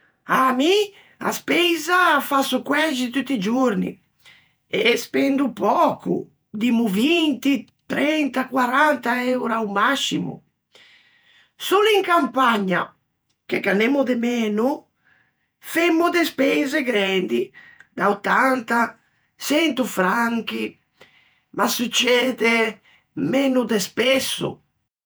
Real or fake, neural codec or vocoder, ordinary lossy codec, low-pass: fake; vocoder, 48 kHz, 128 mel bands, Vocos; none; none